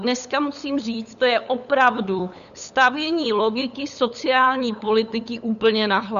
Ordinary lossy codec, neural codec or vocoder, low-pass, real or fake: MP3, 96 kbps; codec, 16 kHz, 8 kbps, FunCodec, trained on LibriTTS, 25 frames a second; 7.2 kHz; fake